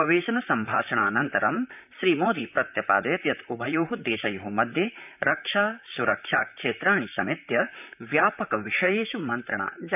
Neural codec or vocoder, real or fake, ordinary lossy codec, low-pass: vocoder, 44.1 kHz, 128 mel bands, Pupu-Vocoder; fake; none; 3.6 kHz